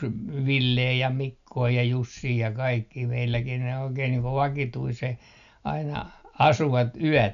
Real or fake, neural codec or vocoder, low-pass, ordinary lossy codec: real; none; 7.2 kHz; none